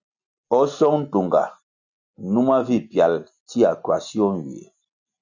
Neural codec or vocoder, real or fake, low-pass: none; real; 7.2 kHz